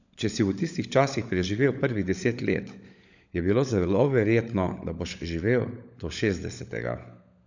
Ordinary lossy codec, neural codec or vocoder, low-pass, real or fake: none; codec, 16 kHz, 16 kbps, FunCodec, trained on LibriTTS, 50 frames a second; 7.2 kHz; fake